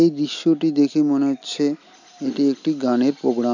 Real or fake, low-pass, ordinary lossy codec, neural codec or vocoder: real; 7.2 kHz; none; none